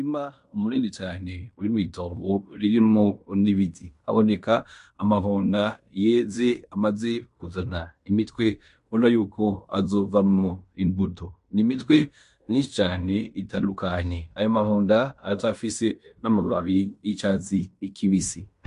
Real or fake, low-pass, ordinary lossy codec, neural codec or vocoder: fake; 10.8 kHz; MP3, 64 kbps; codec, 16 kHz in and 24 kHz out, 0.9 kbps, LongCat-Audio-Codec, fine tuned four codebook decoder